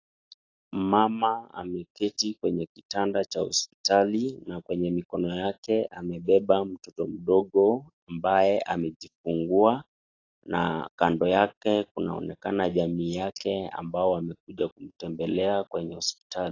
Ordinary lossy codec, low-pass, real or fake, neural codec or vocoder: AAC, 32 kbps; 7.2 kHz; real; none